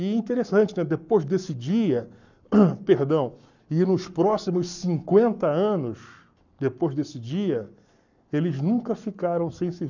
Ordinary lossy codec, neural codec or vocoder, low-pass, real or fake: none; codec, 44.1 kHz, 7.8 kbps, Pupu-Codec; 7.2 kHz; fake